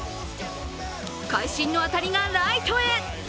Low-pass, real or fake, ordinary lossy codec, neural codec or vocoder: none; real; none; none